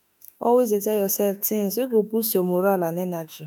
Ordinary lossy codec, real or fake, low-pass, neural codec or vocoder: none; fake; none; autoencoder, 48 kHz, 32 numbers a frame, DAC-VAE, trained on Japanese speech